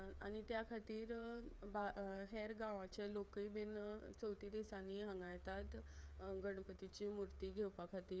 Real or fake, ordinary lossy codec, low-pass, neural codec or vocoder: fake; none; none; codec, 16 kHz, 8 kbps, FreqCodec, smaller model